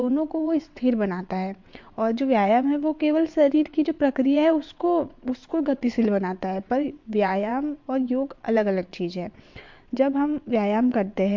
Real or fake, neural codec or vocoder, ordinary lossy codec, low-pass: fake; vocoder, 22.05 kHz, 80 mel bands, WaveNeXt; MP3, 48 kbps; 7.2 kHz